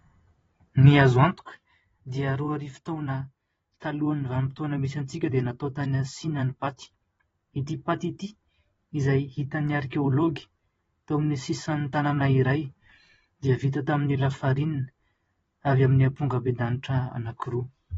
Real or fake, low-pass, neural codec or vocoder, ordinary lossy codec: fake; 19.8 kHz; vocoder, 44.1 kHz, 128 mel bands every 512 samples, BigVGAN v2; AAC, 24 kbps